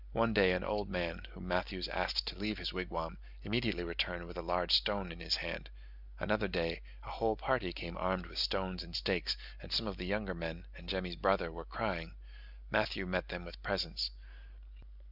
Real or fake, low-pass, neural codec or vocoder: real; 5.4 kHz; none